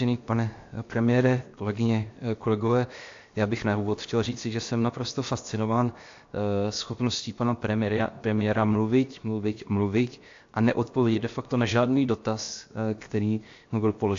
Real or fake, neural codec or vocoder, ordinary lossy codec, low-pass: fake; codec, 16 kHz, 0.7 kbps, FocalCodec; AAC, 48 kbps; 7.2 kHz